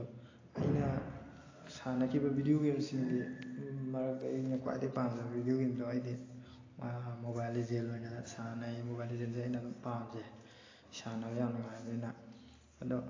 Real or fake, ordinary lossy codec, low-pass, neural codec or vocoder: real; AAC, 32 kbps; 7.2 kHz; none